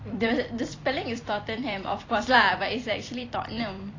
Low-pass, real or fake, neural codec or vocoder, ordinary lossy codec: 7.2 kHz; fake; vocoder, 44.1 kHz, 128 mel bands every 256 samples, BigVGAN v2; AAC, 32 kbps